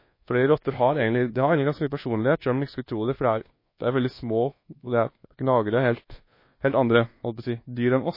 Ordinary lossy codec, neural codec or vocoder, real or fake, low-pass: MP3, 24 kbps; codec, 24 kHz, 1.2 kbps, DualCodec; fake; 5.4 kHz